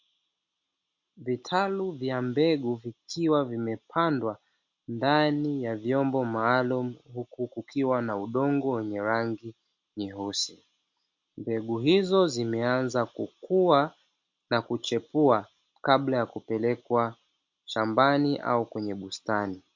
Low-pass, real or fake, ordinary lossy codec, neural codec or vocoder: 7.2 kHz; real; MP3, 48 kbps; none